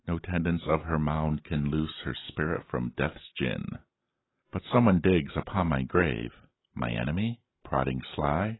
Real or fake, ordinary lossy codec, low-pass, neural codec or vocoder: real; AAC, 16 kbps; 7.2 kHz; none